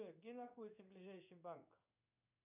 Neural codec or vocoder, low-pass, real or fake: codec, 16 kHz in and 24 kHz out, 1 kbps, XY-Tokenizer; 3.6 kHz; fake